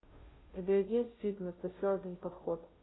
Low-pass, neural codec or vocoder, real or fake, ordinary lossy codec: 7.2 kHz; codec, 16 kHz, 0.5 kbps, FunCodec, trained on Chinese and English, 25 frames a second; fake; AAC, 16 kbps